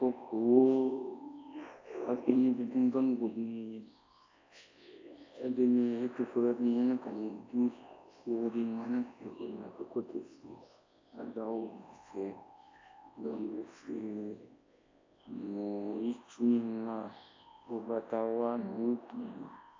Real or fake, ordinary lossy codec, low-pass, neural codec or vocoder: fake; AAC, 32 kbps; 7.2 kHz; codec, 24 kHz, 0.9 kbps, WavTokenizer, large speech release